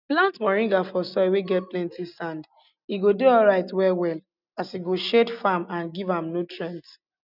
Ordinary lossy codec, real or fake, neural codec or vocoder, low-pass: none; real; none; 5.4 kHz